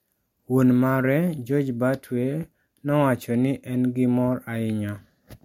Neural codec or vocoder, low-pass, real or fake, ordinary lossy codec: none; 19.8 kHz; real; MP3, 64 kbps